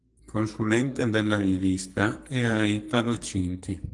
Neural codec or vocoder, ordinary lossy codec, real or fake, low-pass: codec, 44.1 kHz, 2.6 kbps, SNAC; Opus, 24 kbps; fake; 10.8 kHz